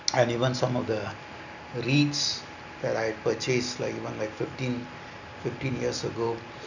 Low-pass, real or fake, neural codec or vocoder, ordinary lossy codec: 7.2 kHz; real; none; none